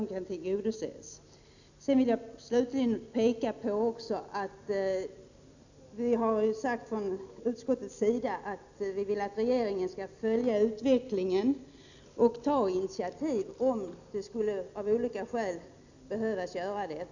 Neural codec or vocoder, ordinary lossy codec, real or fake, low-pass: none; none; real; 7.2 kHz